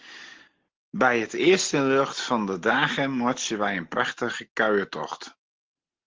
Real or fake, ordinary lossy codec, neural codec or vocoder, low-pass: real; Opus, 16 kbps; none; 7.2 kHz